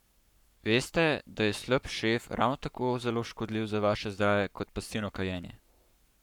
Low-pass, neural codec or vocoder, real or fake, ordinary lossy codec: 19.8 kHz; codec, 44.1 kHz, 7.8 kbps, Pupu-Codec; fake; none